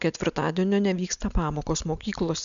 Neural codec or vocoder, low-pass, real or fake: none; 7.2 kHz; real